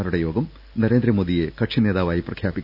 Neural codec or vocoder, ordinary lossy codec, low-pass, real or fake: none; none; 5.4 kHz; real